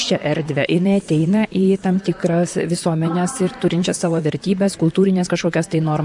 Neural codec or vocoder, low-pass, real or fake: vocoder, 44.1 kHz, 128 mel bands, Pupu-Vocoder; 10.8 kHz; fake